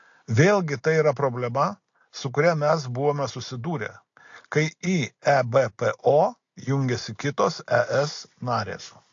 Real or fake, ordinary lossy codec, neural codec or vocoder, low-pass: real; AAC, 48 kbps; none; 7.2 kHz